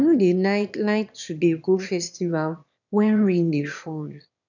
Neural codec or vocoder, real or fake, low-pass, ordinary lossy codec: autoencoder, 22.05 kHz, a latent of 192 numbers a frame, VITS, trained on one speaker; fake; 7.2 kHz; none